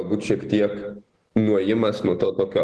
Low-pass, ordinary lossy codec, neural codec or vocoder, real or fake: 10.8 kHz; Opus, 16 kbps; none; real